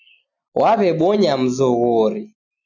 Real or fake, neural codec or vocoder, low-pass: real; none; 7.2 kHz